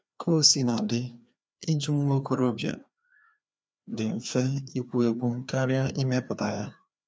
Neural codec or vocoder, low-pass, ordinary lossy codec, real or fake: codec, 16 kHz, 2 kbps, FreqCodec, larger model; none; none; fake